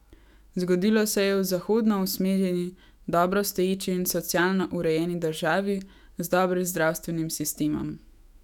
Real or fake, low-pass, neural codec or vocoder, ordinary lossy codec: fake; 19.8 kHz; autoencoder, 48 kHz, 128 numbers a frame, DAC-VAE, trained on Japanese speech; none